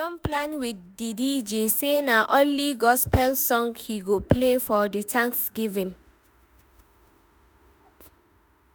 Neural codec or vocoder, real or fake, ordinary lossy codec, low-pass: autoencoder, 48 kHz, 32 numbers a frame, DAC-VAE, trained on Japanese speech; fake; none; none